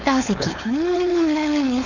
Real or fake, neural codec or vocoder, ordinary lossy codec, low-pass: fake; codec, 16 kHz, 4.8 kbps, FACodec; none; 7.2 kHz